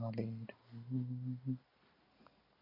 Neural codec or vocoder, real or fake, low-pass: none; real; 5.4 kHz